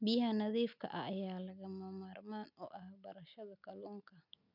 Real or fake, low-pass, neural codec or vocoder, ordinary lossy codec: real; 5.4 kHz; none; none